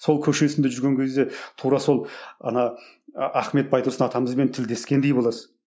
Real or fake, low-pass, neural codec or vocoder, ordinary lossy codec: real; none; none; none